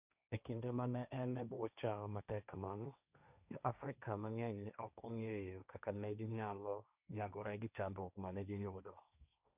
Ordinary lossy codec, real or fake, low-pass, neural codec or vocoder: none; fake; 3.6 kHz; codec, 16 kHz, 1.1 kbps, Voila-Tokenizer